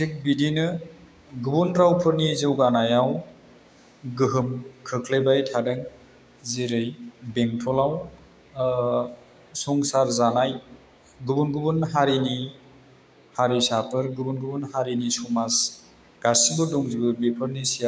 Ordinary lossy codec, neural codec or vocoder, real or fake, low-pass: none; codec, 16 kHz, 6 kbps, DAC; fake; none